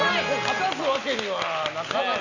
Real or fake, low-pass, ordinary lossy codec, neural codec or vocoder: real; 7.2 kHz; none; none